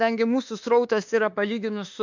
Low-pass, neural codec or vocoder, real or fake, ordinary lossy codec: 7.2 kHz; autoencoder, 48 kHz, 32 numbers a frame, DAC-VAE, trained on Japanese speech; fake; MP3, 64 kbps